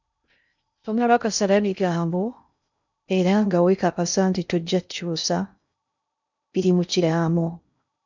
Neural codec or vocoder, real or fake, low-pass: codec, 16 kHz in and 24 kHz out, 0.6 kbps, FocalCodec, streaming, 2048 codes; fake; 7.2 kHz